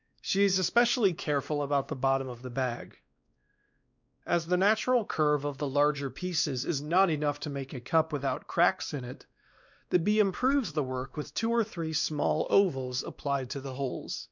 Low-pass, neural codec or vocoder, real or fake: 7.2 kHz; codec, 16 kHz, 2 kbps, X-Codec, WavLM features, trained on Multilingual LibriSpeech; fake